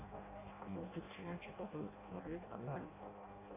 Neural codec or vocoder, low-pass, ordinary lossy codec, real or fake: codec, 16 kHz in and 24 kHz out, 0.6 kbps, FireRedTTS-2 codec; 3.6 kHz; AAC, 16 kbps; fake